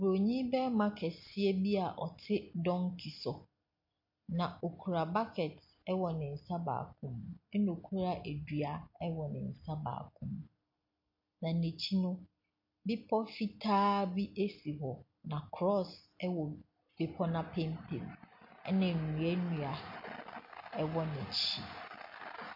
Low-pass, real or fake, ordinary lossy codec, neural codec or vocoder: 5.4 kHz; real; MP3, 32 kbps; none